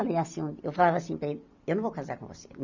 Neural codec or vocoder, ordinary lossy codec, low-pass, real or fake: vocoder, 44.1 kHz, 128 mel bands every 256 samples, BigVGAN v2; none; 7.2 kHz; fake